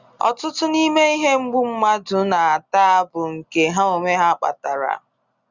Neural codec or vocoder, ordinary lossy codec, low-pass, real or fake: none; Opus, 64 kbps; 7.2 kHz; real